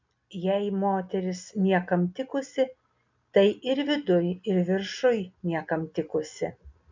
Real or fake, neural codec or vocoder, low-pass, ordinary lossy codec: real; none; 7.2 kHz; MP3, 64 kbps